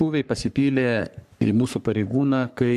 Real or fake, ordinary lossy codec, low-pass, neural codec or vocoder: fake; MP3, 96 kbps; 14.4 kHz; codec, 44.1 kHz, 3.4 kbps, Pupu-Codec